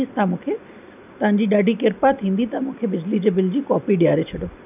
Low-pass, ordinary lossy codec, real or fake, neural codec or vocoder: 3.6 kHz; none; real; none